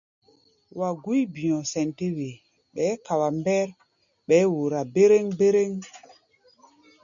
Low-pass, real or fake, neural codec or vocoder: 7.2 kHz; real; none